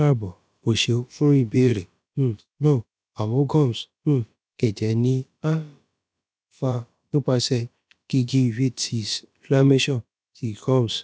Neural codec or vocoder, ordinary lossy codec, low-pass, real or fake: codec, 16 kHz, about 1 kbps, DyCAST, with the encoder's durations; none; none; fake